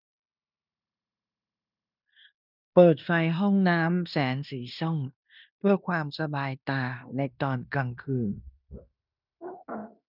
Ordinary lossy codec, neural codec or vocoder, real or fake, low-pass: none; codec, 16 kHz in and 24 kHz out, 0.9 kbps, LongCat-Audio-Codec, fine tuned four codebook decoder; fake; 5.4 kHz